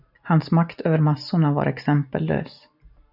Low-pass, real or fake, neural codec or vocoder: 5.4 kHz; real; none